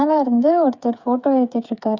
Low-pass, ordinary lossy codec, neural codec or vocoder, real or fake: 7.2 kHz; Opus, 64 kbps; codec, 16 kHz, 8 kbps, FreqCodec, smaller model; fake